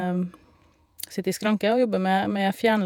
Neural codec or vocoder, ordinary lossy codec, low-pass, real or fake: vocoder, 48 kHz, 128 mel bands, Vocos; none; 19.8 kHz; fake